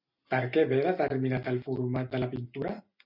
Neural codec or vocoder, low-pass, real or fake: none; 5.4 kHz; real